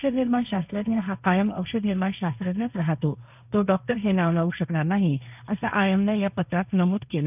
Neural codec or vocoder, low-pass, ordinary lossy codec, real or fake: codec, 16 kHz, 1.1 kbps, Voila-Tokenizer; 3.6 kHz; none; fake